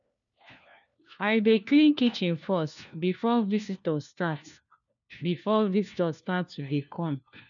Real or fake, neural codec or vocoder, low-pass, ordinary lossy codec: fake; codec, 16 kHz, 1 kbps, FunCodec, trained on LibriTTS, 50 frames a second; 7.2 kHz; none